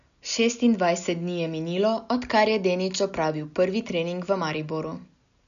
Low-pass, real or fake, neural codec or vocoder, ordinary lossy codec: 7.2 kHz; real; none; none